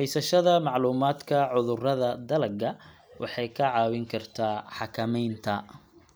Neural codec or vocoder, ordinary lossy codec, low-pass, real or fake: none; none; none; real